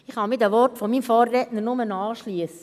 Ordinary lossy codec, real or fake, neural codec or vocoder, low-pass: none; real; none; 14.4 kHz